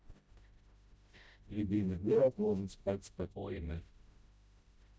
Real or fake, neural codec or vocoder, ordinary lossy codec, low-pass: fake; codec, 16 kHz, 0.5 kbps, FreqCodec, smaller model; none; none